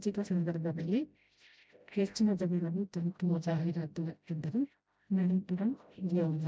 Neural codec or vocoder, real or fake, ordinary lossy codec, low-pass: codec, 16 kHz, 0.5 kbps, FreqCodec, smaller model; fake; none; none